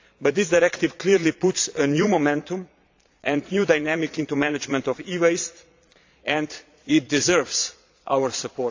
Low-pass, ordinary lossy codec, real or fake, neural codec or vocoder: 7.2 kHz; AAC, 48 kbps; fake; vocoder, 22.05 kHz, 80 mel bands, Vocos